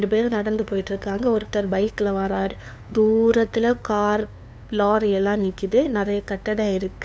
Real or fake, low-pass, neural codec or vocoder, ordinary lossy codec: fake; none; codec, 16 kHz, 2 kbps, FunCodec, trained on LibriTTS, 25 frames a second; none